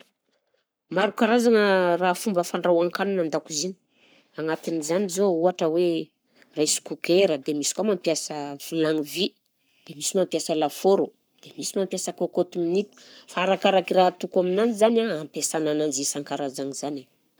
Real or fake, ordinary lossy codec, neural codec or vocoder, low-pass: fake; none; codec, 44.1 kHz, 7.8 kbps, Pupu-Codec; none